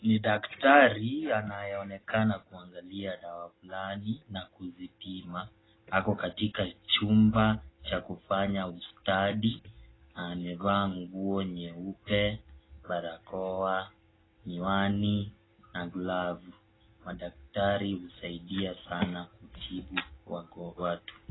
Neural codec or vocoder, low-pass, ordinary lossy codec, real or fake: none; 7.2 kHz; AAC, 16 kbps; real